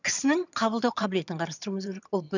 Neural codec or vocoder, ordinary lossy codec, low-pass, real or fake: vocoder, 22.05 kHz, 80 mel bands, HiFi-GAN; none; 7.2 kHz; fake